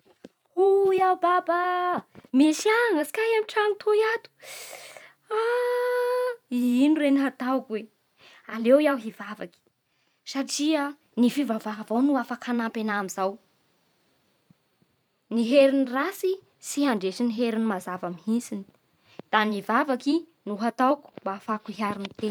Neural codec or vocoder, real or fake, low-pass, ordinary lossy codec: vocoder, 44.1 kHz, 128 mel bands every 512 samples, BigVGAN v2; fake; 19.8 kHz; none